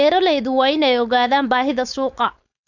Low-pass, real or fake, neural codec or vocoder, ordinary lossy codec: 7.2 kHz; fake; codec, 16 kHz, 4.8 kbps, FACodec; none